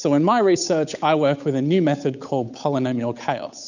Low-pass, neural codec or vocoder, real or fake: 7.2 kHz; codec, 16 kHz, 8 kbps, FunCodec, trained on Chinese and English, 25 frames a second; fake